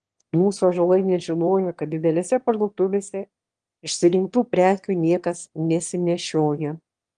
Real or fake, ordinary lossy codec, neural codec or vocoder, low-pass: fake; Opus, 24 kbps; autoencoder, 22.05 kHz, a latent of 192 numbers a frame, VITS, trained on one speaker; 9.9 kHz